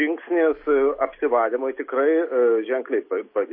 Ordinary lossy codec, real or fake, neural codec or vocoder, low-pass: MP3, 24 kbps; real; none; 5.4 kHz